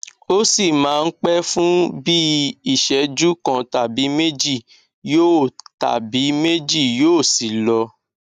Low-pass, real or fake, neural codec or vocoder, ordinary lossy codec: 14.4 kHz; real; none; none